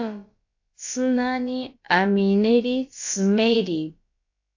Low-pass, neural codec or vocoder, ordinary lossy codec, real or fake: 7.2 kHz; codec, 16 kHz, about 1 kbps, DyCAST, with the encoder's durations; AAC, 48 kbps; fake